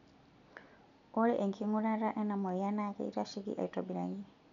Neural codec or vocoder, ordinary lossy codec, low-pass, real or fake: none; none; 7.2 kHz; real